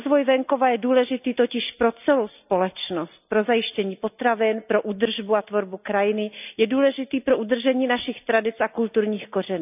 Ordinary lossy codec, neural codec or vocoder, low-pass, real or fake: none; none; 3.6 kHz; real